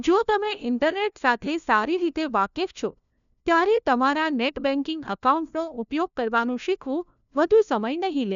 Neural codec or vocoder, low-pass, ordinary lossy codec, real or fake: codec, 16 kHz, 1 kbps, FunCodec, trained on LibriTTS, 50 frames a second; 7.2 kHz; none; fake